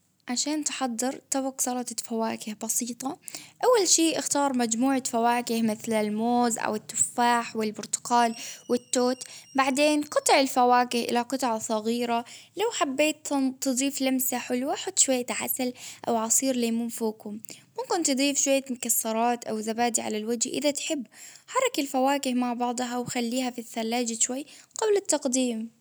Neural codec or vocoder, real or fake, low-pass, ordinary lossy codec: none; real; none; none